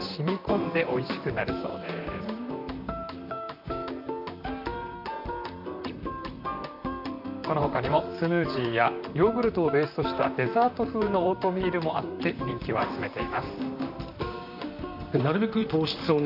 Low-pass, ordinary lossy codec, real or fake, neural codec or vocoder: 5.4 kHz; none; fake; vocoder, 44.1 kHz, 128 mel bands, Pupu-Vocoder